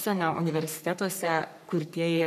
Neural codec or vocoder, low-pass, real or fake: codec, 44.1 kHz, 3.4 kbps, Pupu-Codec; 14.4 kHz; fake